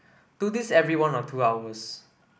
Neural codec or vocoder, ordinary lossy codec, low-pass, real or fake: none; none; none; real